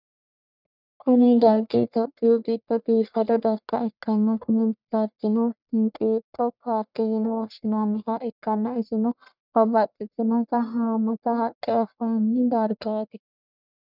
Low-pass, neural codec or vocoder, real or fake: 5.4 kHz; codec, 44.1 kHz, 1.7 kbps, Pupu-Codec; fake